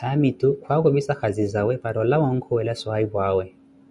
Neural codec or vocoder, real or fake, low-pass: none; real; 10.8 kHz